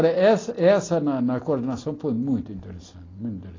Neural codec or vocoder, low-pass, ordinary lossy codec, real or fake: none; 7.2 kHz; AAC, 32 kbps; real